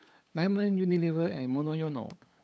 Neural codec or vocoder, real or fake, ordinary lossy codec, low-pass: codec, 16 kHz, 8 kbps, FunCodec, trained on LibriTTS, 25 frames a second; fake; none; none